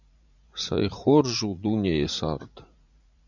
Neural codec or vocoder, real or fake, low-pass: vocoder, 44.1 kHz, 80 mel bands, Vocos; fake; 7.2 kHz